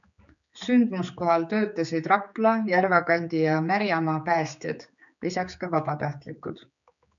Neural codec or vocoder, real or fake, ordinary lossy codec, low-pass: codec, 16 kHz, 4 kbps, X-Codec, HuBERT features, trained on general audio; fake; MP3, 96 kbps; 7.2 kHz